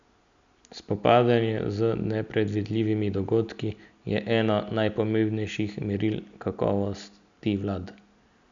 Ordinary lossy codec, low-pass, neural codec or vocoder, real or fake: MP3, 96 kbps; 7.2 kHz; none; real